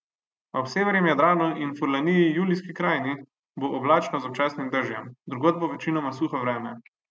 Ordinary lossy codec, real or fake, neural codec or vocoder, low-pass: none; real; none; none